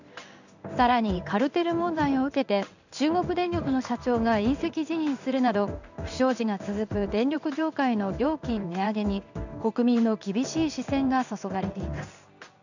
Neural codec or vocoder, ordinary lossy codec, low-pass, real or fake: codec, 16 kHz in and 24 kHz out, 1 kbps, XY-Tokenizer; none; 7.2 kHz; fake